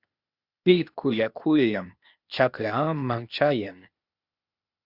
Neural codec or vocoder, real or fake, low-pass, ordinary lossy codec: codec, 16 kHz, 0.8 kbps, ZipCodec; fake; 5.4 kHz; Opus, 64 kbps